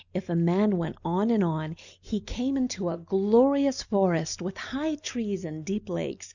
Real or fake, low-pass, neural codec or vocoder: real; 7.2 kHz; none